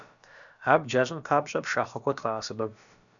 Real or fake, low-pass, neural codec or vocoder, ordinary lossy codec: fake; 7.2 kHz; codec, 16 kHz, about 1 kbps, DyCAST, with the encoder's durations; MP3, 96 kbps